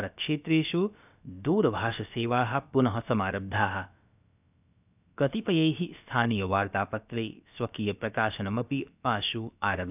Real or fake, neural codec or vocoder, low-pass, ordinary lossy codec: fake; codec, 16 kHz, about 1 kbps, DyCAST, with the encoder's durations; 3.6 kHz; none